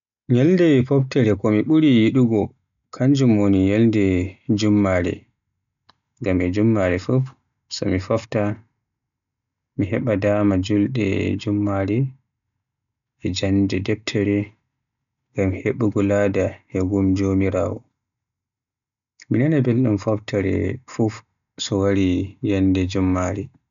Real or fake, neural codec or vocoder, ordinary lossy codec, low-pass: real; none; none; 7.2 kHz